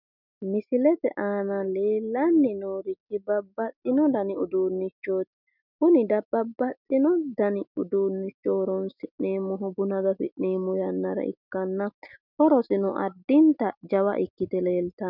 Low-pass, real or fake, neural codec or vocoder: 5.4 kHz; real; none